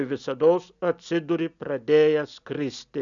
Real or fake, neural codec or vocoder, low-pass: real; none; 7.2 kHz